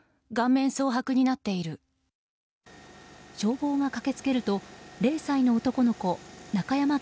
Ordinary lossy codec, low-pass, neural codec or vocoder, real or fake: none; none; none; real